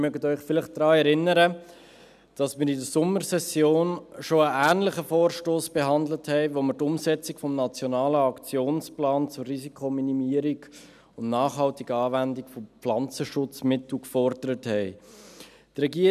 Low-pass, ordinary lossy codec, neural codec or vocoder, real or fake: 14.4 kHz; none; none; real